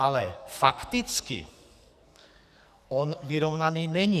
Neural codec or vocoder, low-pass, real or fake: codec, 44.1 kHz, 2.6 kbps, SNAC; 14.4 kHz; fake